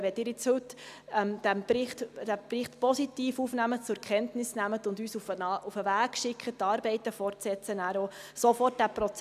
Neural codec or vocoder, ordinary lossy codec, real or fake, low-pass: none; none; real; 14.4 kHz